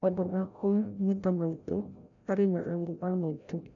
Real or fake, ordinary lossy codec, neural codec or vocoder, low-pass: fake; none; codec, 16 kHz, 0.5 kbps, FreqCodec, larger model; 7.2 kHz